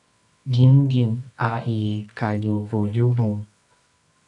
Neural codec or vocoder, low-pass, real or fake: codec, 24 kHz, 0.9 kbps, WavTokenizer, medium music audio release; 10.8 kHz; fake